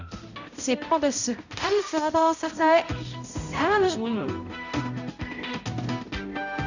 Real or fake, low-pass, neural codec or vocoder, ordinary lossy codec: fake; 7.2 kHz; codec, 16 kHz, 0.5 kbps, X-Codec, HuBERT features, trained on balanced general audio; none